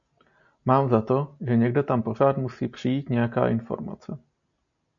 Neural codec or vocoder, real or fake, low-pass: none; real; 7.2 kHz